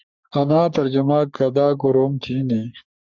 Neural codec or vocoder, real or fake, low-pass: codec, 44.1 kHz, 3.4 kbps, Pupu-Codec; fake; 7.2 kHz